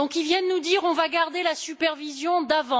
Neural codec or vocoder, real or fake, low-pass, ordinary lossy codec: none; real; none; none